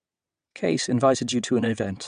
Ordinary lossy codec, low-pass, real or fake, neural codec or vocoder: AAC, 96 kbps; 9.9 kHz; fake; vocoder, 22.05 kHz, 80 mel bands, WaveNeXt